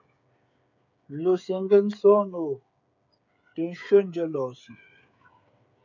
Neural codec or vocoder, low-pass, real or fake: codec, 16 kHz, 8 kbps, FreqCodec, smaller model; 7.2 kHz; fake